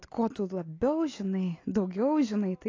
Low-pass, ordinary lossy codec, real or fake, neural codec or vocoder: 7.2 kHz; AAC, 32 kbps; real; none